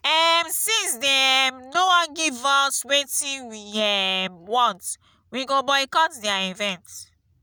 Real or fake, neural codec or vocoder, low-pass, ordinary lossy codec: real; none; none; none